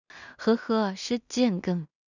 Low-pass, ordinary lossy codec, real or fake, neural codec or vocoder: 7.2 kHz; none; fake; codec, 16 kHz in and 24 kHz out, 0.4 kbps, LongCat-Audio-Codec, two codebook decoder